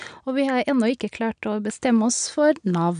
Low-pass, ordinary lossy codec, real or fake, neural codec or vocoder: 9.9 kHz; none; real; none